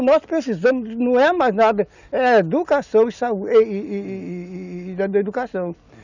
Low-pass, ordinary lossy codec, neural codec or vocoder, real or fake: 7.2 kHz; none; none; real